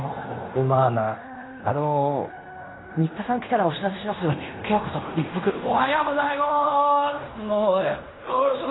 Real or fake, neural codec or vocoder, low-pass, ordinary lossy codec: fake; codec, 16 kHz in and 24 kHz out, 0.9 kbps, LongCat-Audio-Codec, fine tuned four codebook decoder; 7.2 kHz; AAC, 16 kbps